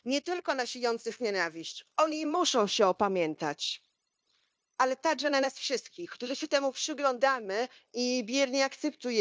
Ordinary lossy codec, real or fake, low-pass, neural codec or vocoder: none; fake; none; codec, 16 kHz, 0.9 kbps, LongCat-Audio-Codec